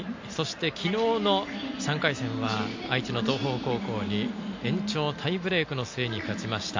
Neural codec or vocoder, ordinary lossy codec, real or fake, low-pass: none; MP3, 48 kbps; real; 7.2 kHz